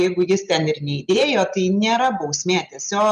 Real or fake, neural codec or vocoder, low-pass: real; none; 10.8 kHz